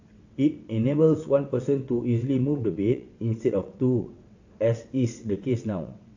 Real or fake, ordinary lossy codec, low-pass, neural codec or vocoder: fake; AAC, 48 kbps; 7.2 kHz; vocoder, 22.05 kHz, 80 mel bands, WaveNeXt